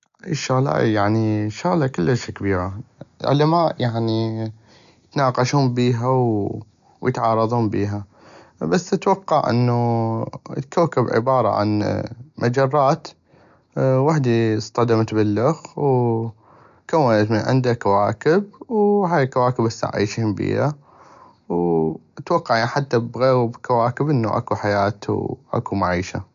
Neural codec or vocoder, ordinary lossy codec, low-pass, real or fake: none; AAC, 96 kbps; 7.2 kHz; real